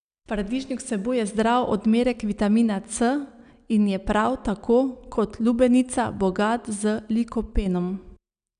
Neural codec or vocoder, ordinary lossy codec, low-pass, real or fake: none; none; 9.9 kHz; real